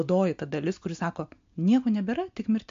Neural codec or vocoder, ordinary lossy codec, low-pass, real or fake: none; MP3, 48 kbps; 7.2 kHz; real